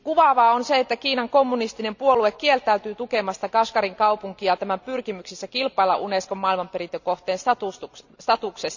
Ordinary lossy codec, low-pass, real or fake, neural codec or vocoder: none; 7.2 kHz; real; none